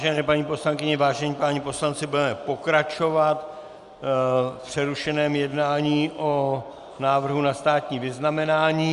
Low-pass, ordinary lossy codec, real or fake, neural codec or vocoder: 10.8 kHz; Opus, 64 kbps; real; none